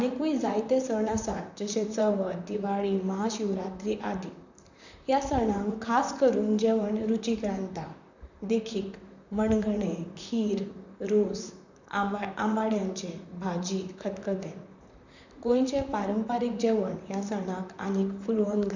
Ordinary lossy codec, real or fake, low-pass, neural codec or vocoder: none; fake; 7.2 kHz; vocoder, 44.1 kHz, 128 mel bands, Pupu-Vocoder